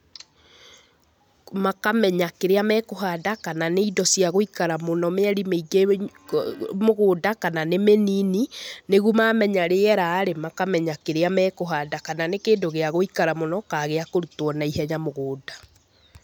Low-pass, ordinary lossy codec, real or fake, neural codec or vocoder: none; none; real; none